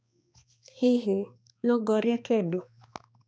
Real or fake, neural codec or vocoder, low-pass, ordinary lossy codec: fake; codec, 16 kHz, 2 kbps, X-Codec, HuBERT features, trained on balanced general audio; none; none